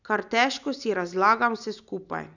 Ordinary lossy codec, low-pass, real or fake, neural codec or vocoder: none; 7.2 kHz; real; none